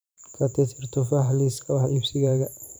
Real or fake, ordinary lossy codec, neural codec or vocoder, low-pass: fake; none; vocoder, 44.1 kHz, 128 mel bands every 512 samples, BigVGAN v2; none